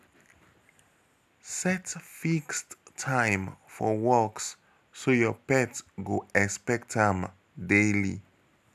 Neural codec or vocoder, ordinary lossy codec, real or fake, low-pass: none; none; real; 14.4 kHz